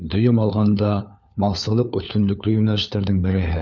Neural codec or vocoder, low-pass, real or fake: codec, 16 kHz, 4 kbps, FunCodec, trained on LibriTTS, 50 frames a second; 7.2 kHz; fake